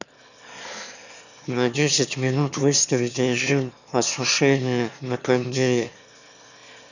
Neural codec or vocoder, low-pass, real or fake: autoencoder, 22.05 kHz, a latent of 192 numbers a frame, VITS, trained on one speaker; 7.2 kHz; fake